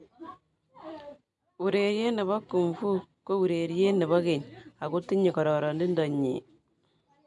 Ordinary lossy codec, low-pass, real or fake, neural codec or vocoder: none; 10.8 kHz; real; none